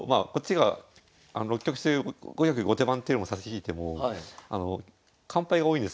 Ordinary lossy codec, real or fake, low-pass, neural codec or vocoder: none; real; none; none